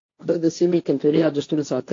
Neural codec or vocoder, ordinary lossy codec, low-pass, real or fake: codec, 16 kHz, 1.1 kbps, Voila-Tokenizer; AAC, 48 kbps; 7.2 kHz; fake